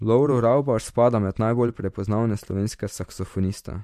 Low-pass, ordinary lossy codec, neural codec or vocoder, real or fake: 14.4 kHz; MP3, 64 kbps; vocoder, 44.1 kHz, 128 mel bands every 512 samples, BigVGAN v2; fake